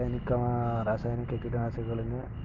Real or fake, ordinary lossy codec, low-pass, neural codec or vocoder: real; Opus, 24 kbps; 7.2 kHz; none